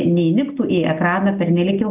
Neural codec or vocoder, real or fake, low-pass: none; real; 3.6 kHz